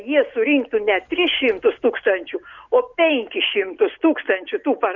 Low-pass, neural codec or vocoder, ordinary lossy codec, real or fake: 7.2 kHz; none; Opus, 64 kbps; real